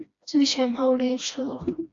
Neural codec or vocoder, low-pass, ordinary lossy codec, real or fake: codec, 16 kHz, 1 kbps, FreqCodec, smaller model; 7.2 kHz; AAC, 48 kbps; fake